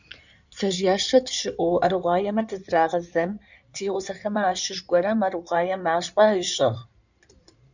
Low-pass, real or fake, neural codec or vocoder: 7.2 kHz; fake; codec, 16 kHz in and 24 kHz out, 2.2 kbps, FireRedTTS-2 codec